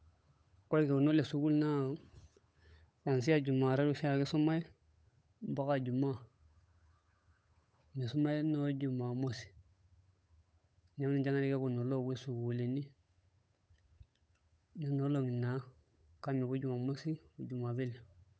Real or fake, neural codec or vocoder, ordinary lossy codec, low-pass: fake; codec, 16 kHz, 8 kbps, FunCodec, trained on Chinese and English, 25 frames a second; none; none